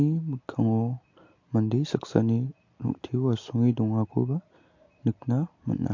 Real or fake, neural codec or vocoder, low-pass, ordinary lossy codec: real; none; 7.2 kHz; MP3, 48 kbps